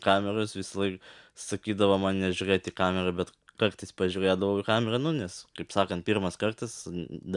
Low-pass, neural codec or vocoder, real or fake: 10.8 kHz; none; real